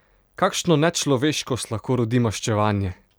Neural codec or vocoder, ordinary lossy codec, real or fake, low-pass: none; none; real; none